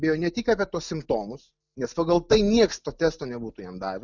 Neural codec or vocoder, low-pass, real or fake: none; 7.2 kHz; real